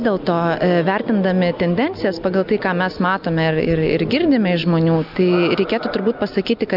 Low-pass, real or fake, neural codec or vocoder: 5.4 kHz; real; none